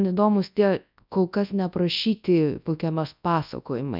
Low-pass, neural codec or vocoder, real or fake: 5.4 kHz; codec, 24 kHz, 0.9 kbps, WavTokenizer, large speech release; fake